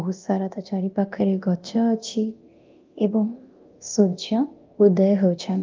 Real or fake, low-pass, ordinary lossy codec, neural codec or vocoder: fake; 7.2 kHz; Opus, 32 kbps; codec, 24 kHz, 0.9 kbps, DualCodec